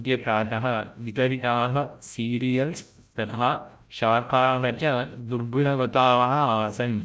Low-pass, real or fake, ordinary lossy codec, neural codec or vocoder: none; fake; none; codec, 16 kHz, 0.5 kbps, FreqCodec, larger model